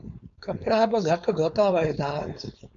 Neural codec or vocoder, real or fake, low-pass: codec, 16 kHz, 4.8 kbps, FACodec; fake; 7.2 kHz